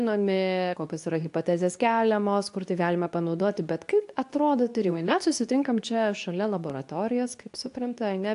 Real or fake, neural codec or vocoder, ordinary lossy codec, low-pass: fake; codec, 24 kHz, 0.9 kbps, WavTokenizer, medium speech release version 2; AAC, 64 kbps; 10.8 kHz